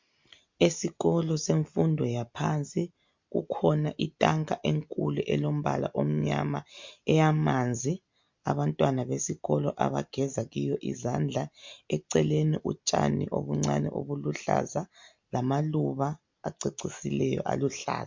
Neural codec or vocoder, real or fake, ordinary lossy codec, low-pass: none; real; MP3, 48 kbps; 7.2 kHz